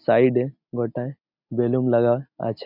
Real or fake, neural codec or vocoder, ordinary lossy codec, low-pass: real; none; none; 5.4 kHz